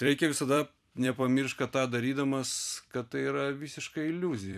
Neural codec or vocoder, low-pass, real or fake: vocoder, 44.1 kHz, 128 mel bands every 256 samples, BigVGAN v2; 14.4 kHz; fake